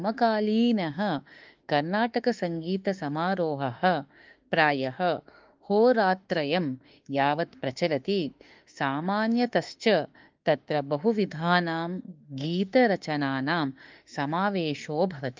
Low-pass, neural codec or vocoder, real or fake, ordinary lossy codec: 7.2 kHz; autoencoder, 48 kHz, 32 numbers a frame, DAC-VAE, trained on Japanese speech; fake; Opus, 24 kbps